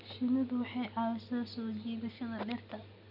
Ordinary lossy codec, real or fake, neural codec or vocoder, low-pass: none; real; none; 5.4 kHz